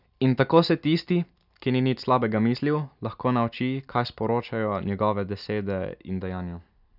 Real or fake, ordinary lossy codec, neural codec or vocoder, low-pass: real; none; none; 5.4 kHz